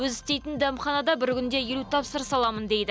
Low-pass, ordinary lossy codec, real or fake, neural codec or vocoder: none; none; real; none